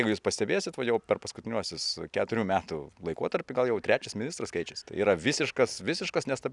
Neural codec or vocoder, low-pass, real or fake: none; 10.8 kHz; real